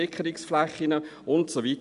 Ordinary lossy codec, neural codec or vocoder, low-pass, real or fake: none; none; 10.8 kHz; real